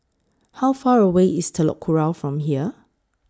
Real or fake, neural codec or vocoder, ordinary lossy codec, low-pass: real; none; none; none